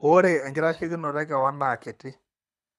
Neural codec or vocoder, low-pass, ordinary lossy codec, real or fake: codec, 24 kHz, 6 kbps, HILCodec; none; none; fake